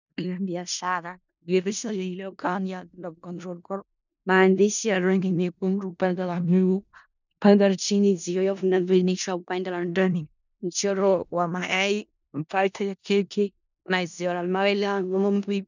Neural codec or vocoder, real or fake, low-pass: codec, 16 kHz in and 24 kHz out, 0.4 kbps, LongCat-Audio-Codec, four codebook decoder; fake; 7.2 kHz